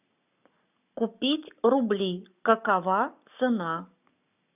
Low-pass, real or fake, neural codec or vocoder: 3.6 kHz; fake; codec, 44.1 kHz, 7.8 kbps, Pupu-Codec